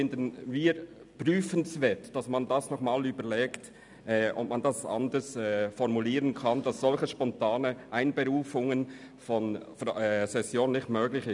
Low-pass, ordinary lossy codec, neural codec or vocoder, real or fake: 10.8 kHz; none; none; real